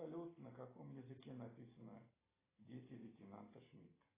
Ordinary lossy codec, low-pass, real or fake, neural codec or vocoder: AAC, 16 kbps; 3.6 kHz; real; none